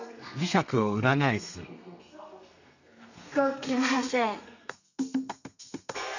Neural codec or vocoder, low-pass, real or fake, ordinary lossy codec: codec, 32 kHz, 1.9 kbps, SNAC; 7.2 kHz; fake; none